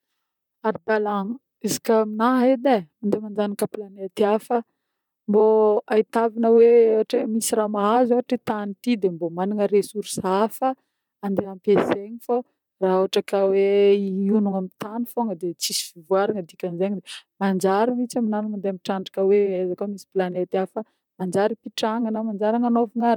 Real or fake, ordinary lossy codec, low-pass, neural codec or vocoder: fake; none; 19.8 kHz; vocoder, 44.1 kHz, 128 mel bands, Pupu-Vocoder